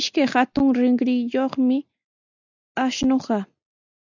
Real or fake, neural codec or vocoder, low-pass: real; none; 7.2 kHz